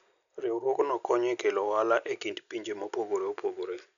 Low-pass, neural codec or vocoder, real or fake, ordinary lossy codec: 7.2 kHz; none; real; none